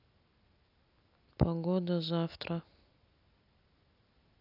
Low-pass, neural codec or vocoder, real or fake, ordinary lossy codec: 5.4 kHz; none; real; none